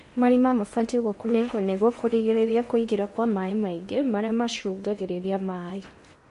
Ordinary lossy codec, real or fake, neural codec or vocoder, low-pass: MP3, 48 kbps; fake; codec, 16 kHz in and 24 kHz out, 0.8 kbps, FocalCodec, streaming, 65536 codes; 10.8 kHz